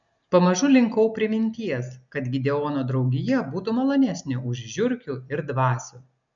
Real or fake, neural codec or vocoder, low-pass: real; none; 7.2 kHz